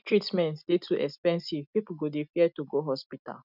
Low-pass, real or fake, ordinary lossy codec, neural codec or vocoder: 5.4 kHz; real; none; none